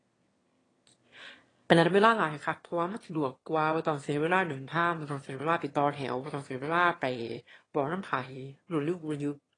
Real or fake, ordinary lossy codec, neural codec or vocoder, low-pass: fake; AAC, 32 kbps; autoencoder, 22.05 kHz, a latent of 192 numbers a frame, VITS, trained on one speaker; 9.9 kHz